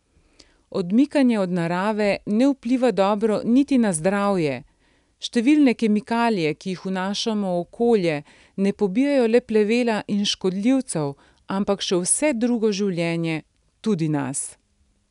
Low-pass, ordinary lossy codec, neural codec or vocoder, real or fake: 10.8 kHz; none; none; real